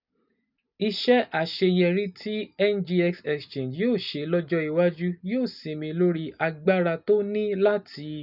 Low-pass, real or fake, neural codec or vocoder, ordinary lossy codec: 5.4 kHz; real; none; none